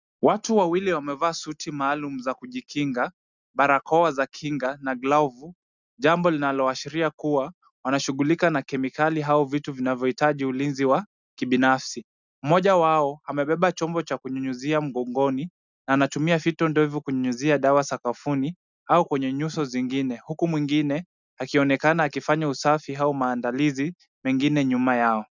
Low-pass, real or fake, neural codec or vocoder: 7.2 kHz; real; none